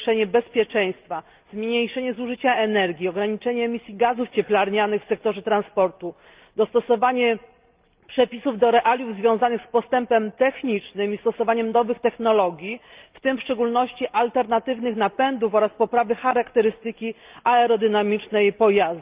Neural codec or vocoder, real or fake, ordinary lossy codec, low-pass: none; real; Opus, 32 kbps; 3.6 kHz